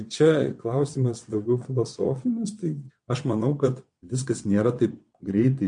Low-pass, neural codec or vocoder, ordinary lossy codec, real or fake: 9.9 kHz; vocoder, 22.05 kHz, 80 mel bands, WaveNeXt; MP3, 48 kbps; fake